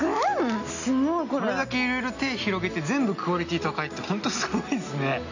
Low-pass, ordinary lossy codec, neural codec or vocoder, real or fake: 7.2 kHz; none; none; real